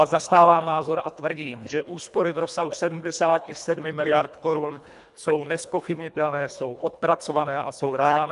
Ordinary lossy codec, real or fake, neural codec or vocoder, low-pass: MP3, 96 kbps; fake; codec, 24 kHz, 1.5 kbps, HILCodec; 10.8 kHz